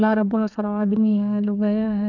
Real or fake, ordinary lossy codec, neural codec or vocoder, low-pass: fake; none; codec, 32 kHz, 1.9 kbps, SNAC; 7.2 kHz